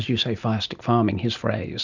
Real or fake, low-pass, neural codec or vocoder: real; 7.2 kHz; none